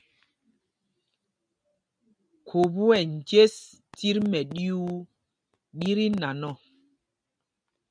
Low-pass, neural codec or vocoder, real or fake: 9.9 kHz; none; real